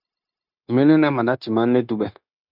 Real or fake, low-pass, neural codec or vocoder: fake; 5.4 kHz; codec, 16 kHz, 0.9 kbps, LongCat-Audio-Codec